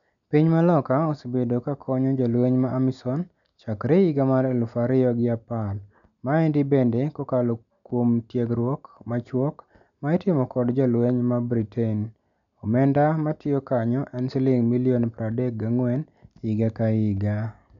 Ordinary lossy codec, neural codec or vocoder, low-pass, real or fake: none; none; 7.2 kHz; real